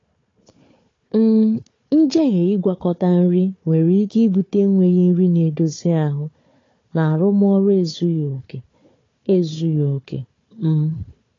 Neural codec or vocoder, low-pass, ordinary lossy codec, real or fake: codec, 16 kHz, 4 kbps, FunCodec, trained on Chinese and English, 50 frames a second; 7.2 kHz; AAC, 32 kbps; fake